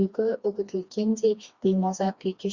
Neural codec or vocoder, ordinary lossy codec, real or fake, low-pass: codec, 16 kHz, 2 kbps, FreqCodec, smaller model; Opus, 64 kbps; fake; 7.2 kHz